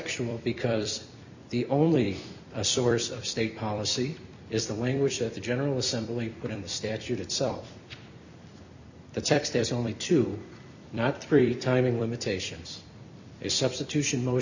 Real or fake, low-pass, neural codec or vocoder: fake; 7.2 kHz; vocoder, 44.1 kHz, 128 mel bands every 256 samples, BigVGAN v2